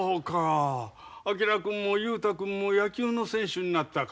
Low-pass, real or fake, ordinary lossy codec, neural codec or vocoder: none; real; none; none